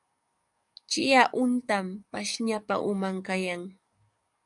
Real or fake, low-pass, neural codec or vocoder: fake; 10.8 kHz; codec, 44.1 kHz, 7.8 kbps, DAC